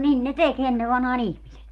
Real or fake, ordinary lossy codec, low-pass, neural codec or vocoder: real; Opus, 24 kbps; 19.8 kHz; none